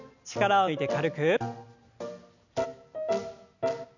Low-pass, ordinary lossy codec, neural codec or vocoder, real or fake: 7.2 kHz; none; none; real